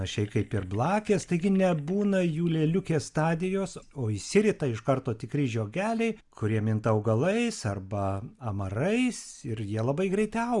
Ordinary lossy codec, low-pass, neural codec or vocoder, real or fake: Opus, 64 kbps; 10.8 kHz; none; real